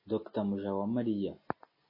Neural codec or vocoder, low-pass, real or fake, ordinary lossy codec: none; 5.4 kHz; real; MP3, 24 kbps